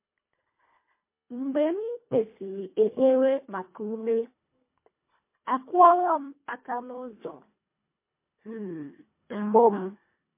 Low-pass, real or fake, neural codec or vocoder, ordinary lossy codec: 3.6 kHz; fake; codec, 24 kHz, 1.5 kbps, HILCodec; MP3, 24 kbps